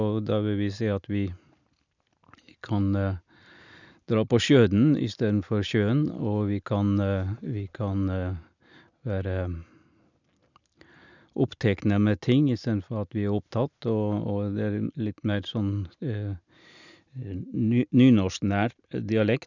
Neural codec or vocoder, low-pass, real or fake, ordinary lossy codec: none; 7.2 kHz; real; none